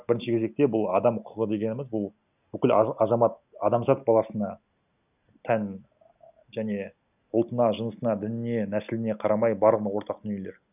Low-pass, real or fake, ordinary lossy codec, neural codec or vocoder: 3.6 kHz; real; none; none